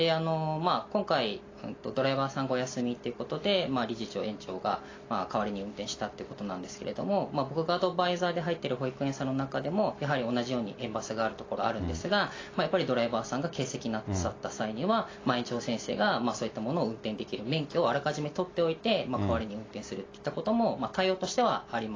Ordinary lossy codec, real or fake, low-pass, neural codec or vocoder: AAC, 32 kbps; real; 7.2 kHz; none